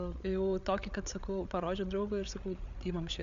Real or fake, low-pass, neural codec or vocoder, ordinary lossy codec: fake; 7.2 kHz; codec, 16 kHz, 8 kbps, FreqCodec, larger model; AAC, 96 kbps